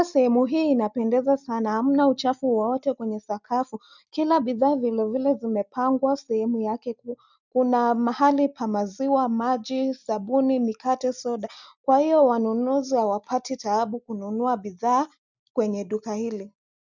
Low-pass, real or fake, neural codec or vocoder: 7.2 kHz; real; none